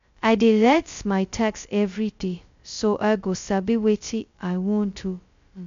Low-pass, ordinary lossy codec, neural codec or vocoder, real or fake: 7.2 kHz; MP3, 64 kbps; codec, 16 kHz, 0.2 kbps, FocalCodec; fake